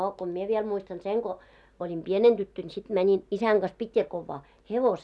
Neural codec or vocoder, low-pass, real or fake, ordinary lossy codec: none; none; real; none